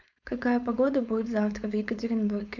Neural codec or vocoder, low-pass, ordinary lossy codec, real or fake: codec, 16 kHz, 4.8 kbps, FACodec; 7.2 kHz; none; fake